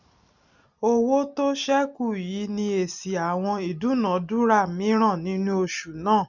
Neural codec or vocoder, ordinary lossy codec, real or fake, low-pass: none; none; real; 7.2 kHz